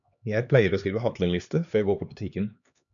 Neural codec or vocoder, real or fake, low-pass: codec, 16 kHz, 2 kbps, X-Codec, HuBERT features, trained on LibriSpeech; fake; 7.2 kHz